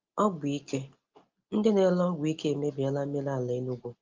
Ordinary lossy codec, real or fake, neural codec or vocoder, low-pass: Opus, 32 kbps; real; none; 7.2 kHz